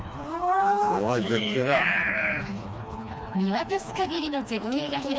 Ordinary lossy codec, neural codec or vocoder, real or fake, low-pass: none; codec, 16 kHz, 2 kbps, FreqCodec, smaller model; fake; none